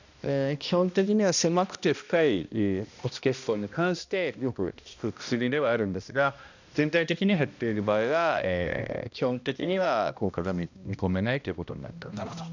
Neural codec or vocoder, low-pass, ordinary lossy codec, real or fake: codec, 16 kHz, 1 kbps, X-Codec, HuBERT features, trained on balanced general audio; 7.2 kHz; none; fake